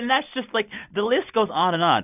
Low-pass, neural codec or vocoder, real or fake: 3.6 kHz; none; real